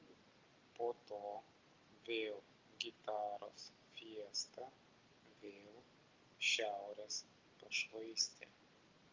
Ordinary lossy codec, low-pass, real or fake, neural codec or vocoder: Opus, 32 kbps; 7.2 kHz; real; none